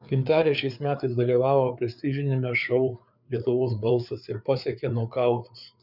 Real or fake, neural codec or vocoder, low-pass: fake; codec, 16 kHz, 4 kbps, FreqCodec, larger model; 5.4 kHz